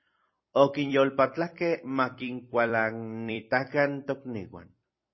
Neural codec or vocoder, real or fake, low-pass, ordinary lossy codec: vocoder, 44.1 kHz, 128 mel bands every 256 samples, BigVGAN v2; fake; 7.2 kHz; MP3, 24 kbps